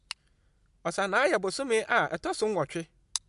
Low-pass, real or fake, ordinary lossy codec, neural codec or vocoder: 10.8 kHz; real; MP3, 64 kbps; none